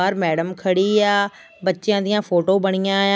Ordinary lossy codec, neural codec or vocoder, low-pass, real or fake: none; none; none; real